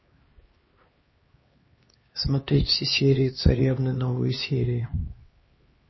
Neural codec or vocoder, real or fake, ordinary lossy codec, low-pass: codec, 16 kHz, 2 kbps, X-Codec, HuBERT features, trained on LibriSpeech; fake; MP3, 24 kbps; 7.2 kHz